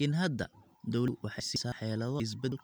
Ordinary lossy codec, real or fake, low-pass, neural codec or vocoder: none; real; none; none